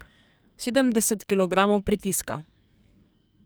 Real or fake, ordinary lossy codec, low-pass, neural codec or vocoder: fake; none; none; codec, 44.1 kHz, 2.6 kbps, SNAC